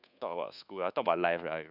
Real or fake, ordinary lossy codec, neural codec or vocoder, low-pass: fake; none; codec, 24 kHz, 1.2 kbps, DualCodec; 5.4 kHz